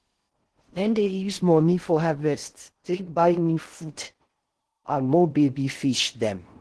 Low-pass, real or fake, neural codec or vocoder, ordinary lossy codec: 10.8 kHz; fake; codec, 16 kHz in and 24 kHz out, 0.6 kbps, FocalCodec, streaming, 4096 codes; Opus, 16 kbps